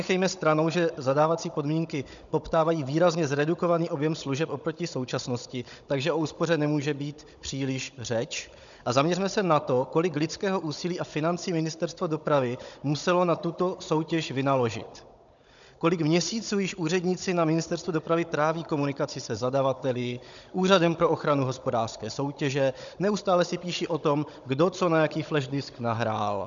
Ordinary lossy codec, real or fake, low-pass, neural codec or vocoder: MP3, 96 kbps; fake; 7.2 kHz; codec, 16 kHz, 16 kbps, FunCodec, trained on Chinese and English, 50 frames a second